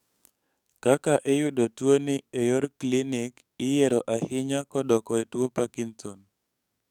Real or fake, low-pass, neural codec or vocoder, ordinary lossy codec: fake; 19.8 kHz; codec, 44.1 kHz, 7.8 kbps, DAC; none